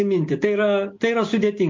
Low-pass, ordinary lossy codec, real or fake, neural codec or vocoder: 7.2 kHz; MP3, 48 kbps; real; none